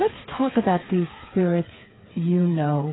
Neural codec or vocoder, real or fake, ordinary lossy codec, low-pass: codec, 16 kHz, 4 kbps, FreqCodec, smaller model; fake; AAC, 16 kbps; 7.2 kHz